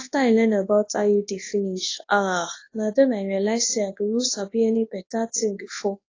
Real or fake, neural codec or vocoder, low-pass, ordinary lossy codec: fake; codec, 24 kHz, 0.9 kbps, WavTokenizer, large speech release; 7.2 kHz; AAC, 32 kbps